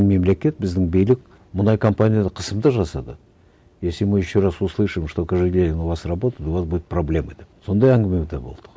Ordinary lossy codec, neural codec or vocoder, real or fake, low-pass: none; none; real; none